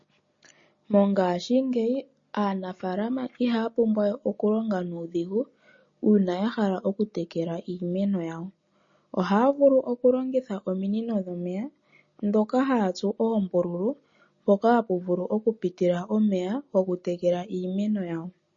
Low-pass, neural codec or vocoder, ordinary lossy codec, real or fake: 7.2 kHz; none; MP3, 32 kbps; real